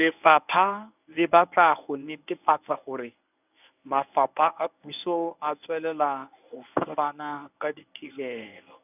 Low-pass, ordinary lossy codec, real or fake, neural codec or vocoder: 3.6 kHz; none; fake; codec, 24 kHz, 0.9 kbps, WavTokenizer, medium speech release version 1